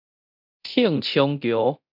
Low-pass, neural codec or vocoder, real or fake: 5.4 kHz; codec, 24 kHz, 0.9 kbps, DualCodec; fake